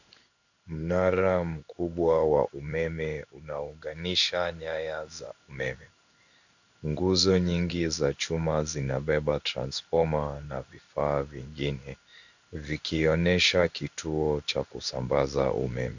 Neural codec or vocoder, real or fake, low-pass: codec, 16 kHz in and 24 kHz out, 1 kbps, XY-Tokenizer; fake; 7.2 kHz